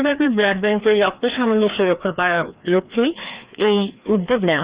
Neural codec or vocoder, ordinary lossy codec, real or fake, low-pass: codec, 16 kHz, 2 kbps, FreqCodec, larger model; Opus, 64 kbps; fake; 3.6 kHz